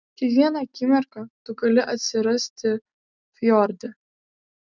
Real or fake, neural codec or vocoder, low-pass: real; none; 7.2 kHz